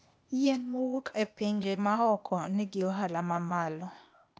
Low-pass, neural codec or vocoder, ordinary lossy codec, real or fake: none; codec, 16 kHz, 0.8 kbps, ZipCodec; none; fake